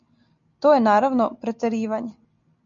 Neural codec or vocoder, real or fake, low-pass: none; real; 7.2 kHz